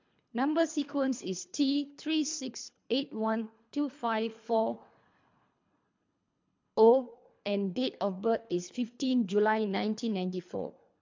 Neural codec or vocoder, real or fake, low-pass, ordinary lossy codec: codec, 24 kHz, 3 kbps, HILCodec; fake; 7.2 kHz; MP3, 64 kbps